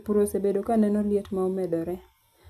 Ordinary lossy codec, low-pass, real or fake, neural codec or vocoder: none; 14.4 kHz; real; none